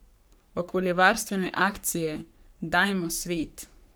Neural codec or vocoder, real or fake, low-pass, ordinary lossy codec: codec, 44.1 kHz, 3.4 kbps, Pupu-Codec; fake; none; none